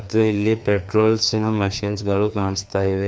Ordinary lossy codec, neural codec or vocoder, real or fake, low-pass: none; codec, 16 kHz, 2 kbps, FreqCodec, larger model; fake; none